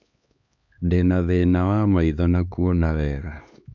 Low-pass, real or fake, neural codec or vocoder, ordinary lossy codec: 7.2 kHz; fake; codec, 16 kHz, 2 kbps, X-Codec, HuBERT features, trained on LibriSpeech; MP3, 64 kbps